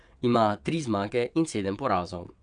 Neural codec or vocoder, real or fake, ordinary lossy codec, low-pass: vocoder, 22.05 kHz, 80 mel bands, WaveNeXt; fake; none; 9.9 kHz